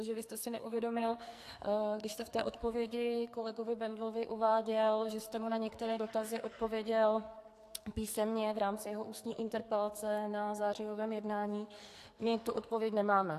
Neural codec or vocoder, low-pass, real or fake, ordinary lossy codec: codec, 44.1 kHz, 2.6 kbps, SNAC; 14.4 kHz; fake; AAC, 64 kbps